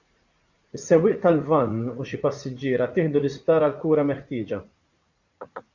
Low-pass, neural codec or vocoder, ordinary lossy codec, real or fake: 7.2 kHz; vocoder, 44.1 kHz, 80 mel bands, Vocos; Opus, 64 kbps; fake